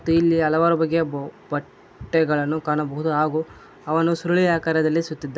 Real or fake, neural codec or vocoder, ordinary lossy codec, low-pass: real; none; none; none